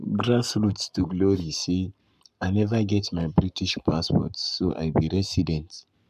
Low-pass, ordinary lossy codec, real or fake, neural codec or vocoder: 14.4 kHz; none; fake; codec, 44.1 kHz, 7.8 kbps, Pupu-Codec